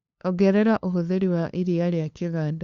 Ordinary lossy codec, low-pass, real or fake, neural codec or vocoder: Opus, 64 kbps; 7.2 kHz; fake; codec, 16 kHz, 2 kbps, FunCodec, trained on LibriTTS, 25 frames a second